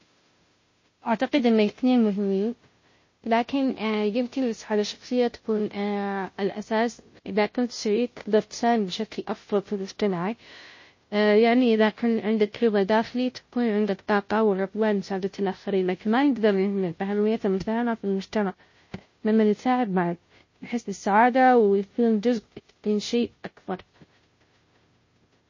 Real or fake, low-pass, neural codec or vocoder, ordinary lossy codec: fake; 7.2 kHz; codec, 16 kHz, 0.5 kbps, FunCodec, trained on Chinese and English, 25 frames a second; MP3, 32 kbps